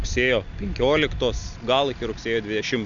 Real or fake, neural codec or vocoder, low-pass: real; none; 7.2 kHz